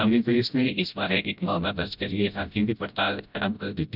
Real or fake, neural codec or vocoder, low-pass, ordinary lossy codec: fake; codec, 16 kHz, 0.5 kbps, FreqCodec, smaller model; 5.4 kHz; none